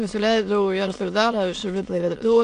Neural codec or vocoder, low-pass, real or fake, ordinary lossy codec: autoencoder, 22.05 kHz, a latent of 192 numbers a frame, VITS, trained on many speakers; 9.9 kHz; fake; AAC, 64 kbps